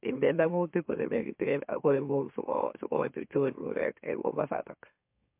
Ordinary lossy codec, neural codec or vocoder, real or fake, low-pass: MP3, 32 kbps; autoencoder, 44.1 kHz, a latent of 192 numbers a frame, MeloTTS; fake; 3.6 kHz